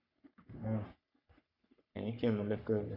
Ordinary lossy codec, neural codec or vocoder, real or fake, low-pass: none; codec, 44.1 kHz, 3.4 kbps, Pupu-Codec; fake; 5.4 kHz